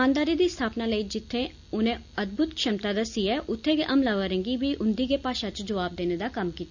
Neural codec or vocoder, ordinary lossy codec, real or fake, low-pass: none; none; real; 7.2 kHz